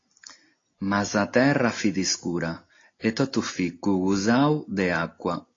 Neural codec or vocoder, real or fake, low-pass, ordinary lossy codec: none; real; 7.2 kHz; AAC, 32 kbps